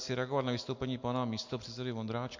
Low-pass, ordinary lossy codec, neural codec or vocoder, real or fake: 7.2 kHz; MP3, 48 kbps; none; real